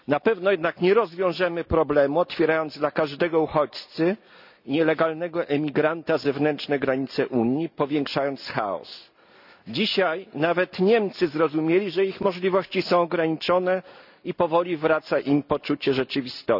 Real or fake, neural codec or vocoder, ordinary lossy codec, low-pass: real; none; none; 5.4 kHz